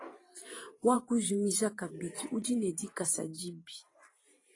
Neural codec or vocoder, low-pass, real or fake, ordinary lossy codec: vocoder, 44.1 kHz, 128 mel bands every 512 samples, BigVGAN v2; 10.8 kHz; fake; AAC, 32 kbps